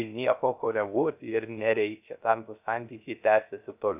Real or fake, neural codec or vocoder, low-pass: fake; codec, 16 kHz, 0.3 kbps, FocalCodec; 3.6 kHz